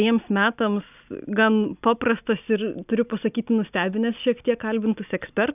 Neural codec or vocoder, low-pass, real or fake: none; 3.6 kHz; real